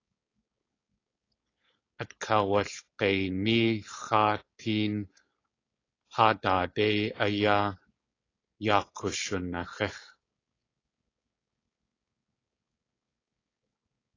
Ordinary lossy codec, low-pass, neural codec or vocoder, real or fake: AAC, 32 kbps; 7.2 kHz; codec, 16 kHz, 4.8 kbps, FACodec; fake